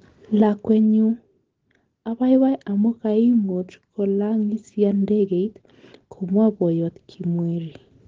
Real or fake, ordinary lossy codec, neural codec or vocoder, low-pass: real; Opus, 16 kbps; none; 7.2 kHz